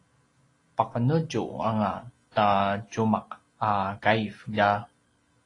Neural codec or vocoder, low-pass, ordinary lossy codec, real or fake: none; 10.8 kHz; AAC, 32 kbps; real